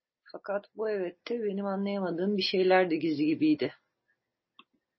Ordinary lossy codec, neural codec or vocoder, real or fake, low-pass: MP3, 24 kbps; none; real; 7.2 kHz